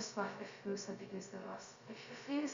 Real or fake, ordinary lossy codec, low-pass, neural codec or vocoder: fake; Opus, 64 kbps; 7.2 kHz; codec, 16 kHz, 0.2 kbps, FocalCodec